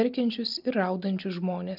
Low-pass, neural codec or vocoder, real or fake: 5.4 kHz; none; real